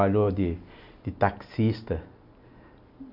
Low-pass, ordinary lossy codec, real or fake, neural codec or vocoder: 5.4 kHz; none; real; none